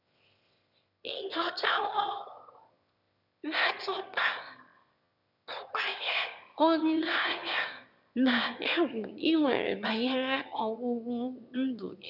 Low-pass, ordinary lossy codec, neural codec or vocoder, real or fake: 5.4 kHz; none; autoencoder, 22.05 kHz, a latent of 192 numbers a frame, VITS, trained on one speaker; fake